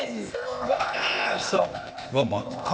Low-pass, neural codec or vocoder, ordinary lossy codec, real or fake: none; codec, 16 kHz, 0.8 kbps, ZipCodec; none; fake